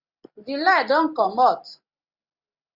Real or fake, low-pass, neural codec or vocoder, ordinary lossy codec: real; 5.4 kHz; none; Opus, 64 kbps